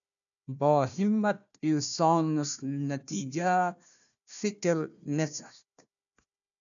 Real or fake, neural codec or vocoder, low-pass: fake; codec, 16 kHz, 1 kbps, FunCodec, trained on Chinese and English, 50 frames a second; 7.2 kHz